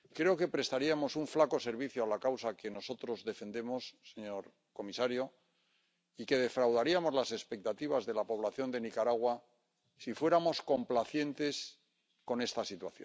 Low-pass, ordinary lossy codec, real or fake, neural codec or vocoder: none; none; real; none